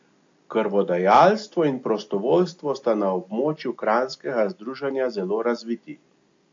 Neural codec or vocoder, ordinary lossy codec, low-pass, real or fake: none; AAC, 48 kbps; 7.2 kHz; real